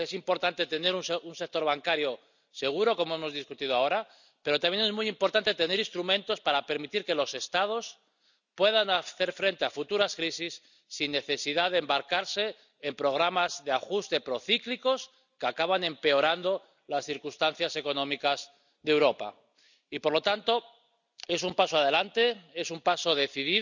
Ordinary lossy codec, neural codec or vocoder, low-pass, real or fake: none; none; 7.2 kHz; real